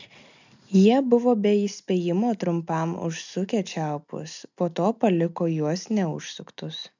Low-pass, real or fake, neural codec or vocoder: 7.2 kHz; real; none